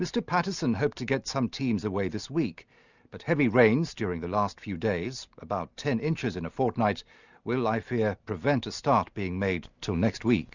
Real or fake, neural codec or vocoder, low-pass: real; none; 7.2 kHz